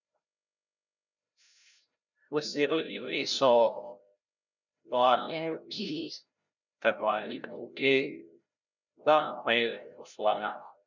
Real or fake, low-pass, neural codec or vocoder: fake; 7.2 kHz; codec, 16 kHz, 0.5 kbps, FreqCodec, larger model